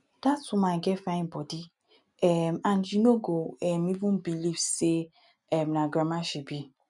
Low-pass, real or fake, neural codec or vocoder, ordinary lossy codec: 10.8 kHz; real; none; none